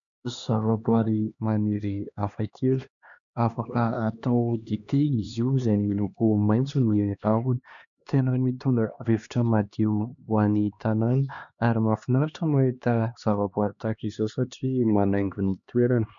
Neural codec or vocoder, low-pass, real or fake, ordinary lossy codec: codec, 16 kHz, 2 kbps, X-Codec, HuBERT features, trained on LibriSpeech; 7.2 kHz; fake; MP3, 96 kbps